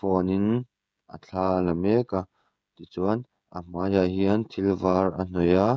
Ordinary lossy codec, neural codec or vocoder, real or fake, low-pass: none; codec, 16 kHz, 16 kbps, FreqCodec, smaller model; fake; none